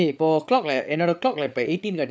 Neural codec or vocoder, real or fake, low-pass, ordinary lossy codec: codec, 16 kHz, 8 kbps, FreqCodec, larger model; fake; none; none